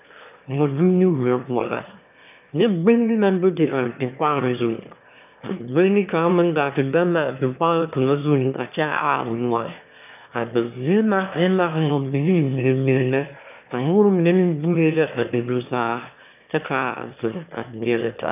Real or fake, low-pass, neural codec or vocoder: fake; 3.6 kHz; autoencoder, 22.05 kHz, a latent of 192 numbers a frame, VITS, trained on one speaker